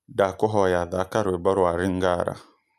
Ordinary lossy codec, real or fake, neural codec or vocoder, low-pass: none; real; none; 14.4 kHz